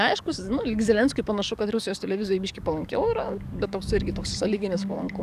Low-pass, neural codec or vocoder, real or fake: 14.4 kHz; codec, 44.1 kHz, 7.8 kbps, DAC; fake